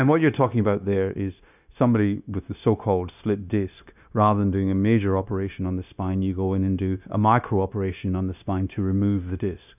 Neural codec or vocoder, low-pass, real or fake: codec, 16 kHz, 0.9 kbps, LongCat-Audio-Codec; 3.6 kHz; fake